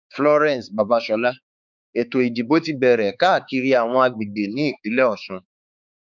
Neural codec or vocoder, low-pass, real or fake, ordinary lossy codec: codec, 16 kHz, 4 kbps, X-Codec, HuBERT features, trained on balanced general audio; 7.2 kHz; fake; none